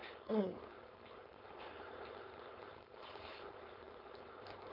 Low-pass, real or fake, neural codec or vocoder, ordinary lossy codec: 5.4 kHz; fake; codec, 16 kHz, 4.8 kbps, FACodec; none